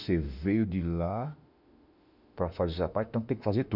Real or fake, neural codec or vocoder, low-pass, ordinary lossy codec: fake; autoencoder, 48 kHz, 32 numbers a frame, DAC-VAE, trained on Japanese speech; 5.4 kHz; none